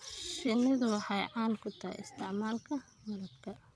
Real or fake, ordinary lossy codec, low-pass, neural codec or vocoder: fake; none; none; vocoder, 22.05 kHz, 80 mel bands, WaveNeXt